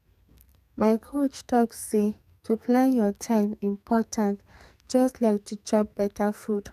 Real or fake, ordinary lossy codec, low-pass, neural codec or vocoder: fake; none; 14.4 kHz; codec, 44.1 kHz, 2.6 kbps, SNAC